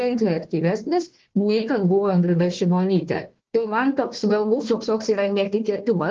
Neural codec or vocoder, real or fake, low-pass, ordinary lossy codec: codec, 16 kHz, 1 kbps, FunCodec, trained on Chinese and English, 50 frames a second; fake; 7.2 kHz; Opus, 16 kbps